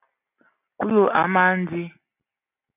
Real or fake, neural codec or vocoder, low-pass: real; none; 3.6 kHz